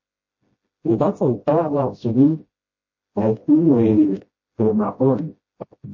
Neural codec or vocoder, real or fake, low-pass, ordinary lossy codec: codec, 16 kHz, 0.5 kbps, FreqCodec, smaller model; fake; 7.2 kHz; MP3, 32 kbps